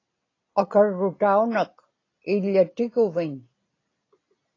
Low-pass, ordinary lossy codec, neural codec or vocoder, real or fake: 7.2 kHz; AAC, 32 kbps; none; real